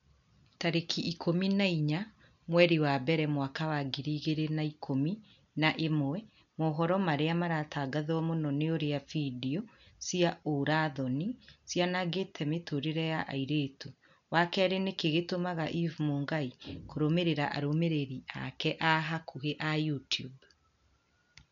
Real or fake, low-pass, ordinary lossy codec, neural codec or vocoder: real; 7.2 kHz; none; none